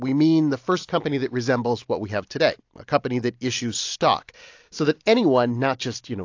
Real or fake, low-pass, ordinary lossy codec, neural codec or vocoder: real; 7.2 kHz; AAC, 48 kbps; none